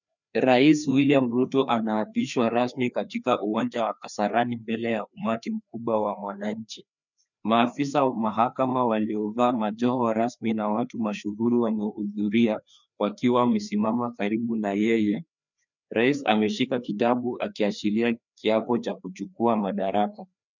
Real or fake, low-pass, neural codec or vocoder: fake; 7.2 kHz; codec, 16 kHz, 2 kbps, FreqCodec, larger model